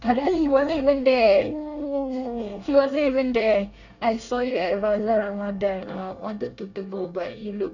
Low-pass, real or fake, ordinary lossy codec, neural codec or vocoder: 7.2 kHz; fake; none; codec, 24 kHz, 1 kbps, SNAC